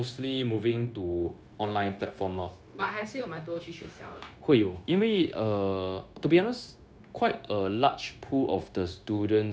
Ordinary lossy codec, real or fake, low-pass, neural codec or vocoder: none; fake; none; codec, 16 kHz, 0.9 kbps, LongCat-Audio-Codec